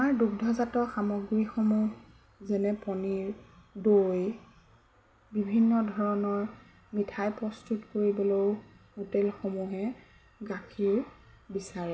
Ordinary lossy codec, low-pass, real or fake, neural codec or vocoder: none; none; real; none